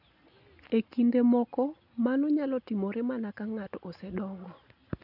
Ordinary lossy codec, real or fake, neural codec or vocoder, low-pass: none; real; none; 5.4 kHz